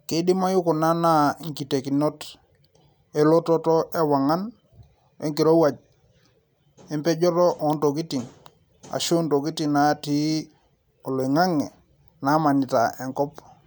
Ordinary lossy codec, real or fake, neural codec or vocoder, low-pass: none; real; none; none